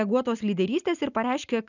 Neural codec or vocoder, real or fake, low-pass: none; real; 7.2 kHz